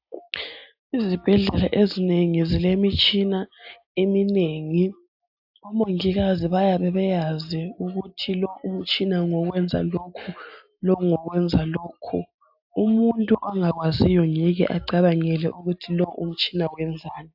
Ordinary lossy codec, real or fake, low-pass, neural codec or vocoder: AAC, 48 kbps; real; 5.4 kHz; none